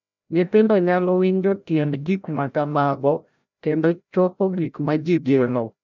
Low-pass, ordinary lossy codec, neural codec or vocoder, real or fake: 7.2 kHz; none; codec, 16 kHz, 0.5 kbps, FreqCodec, larger model; fake